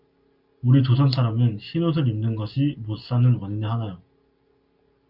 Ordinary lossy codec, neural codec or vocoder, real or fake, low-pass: Opus, 64 kbps; none; real; 5.4 kHz